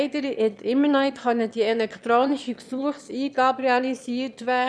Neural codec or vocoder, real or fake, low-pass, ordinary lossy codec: autoencoder, 22.05 kHz, a latent of 192 numbers a frame, VITS, trained on one speaker; fake; none; none